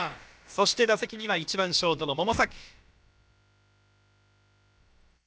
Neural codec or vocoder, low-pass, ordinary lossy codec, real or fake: codec, 16 kHz, about 1 kbps, DyCAST, with the encoder's durations; none; none; fake